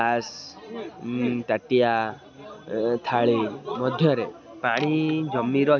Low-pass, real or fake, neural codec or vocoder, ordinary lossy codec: 7.2 kHz; real; none; none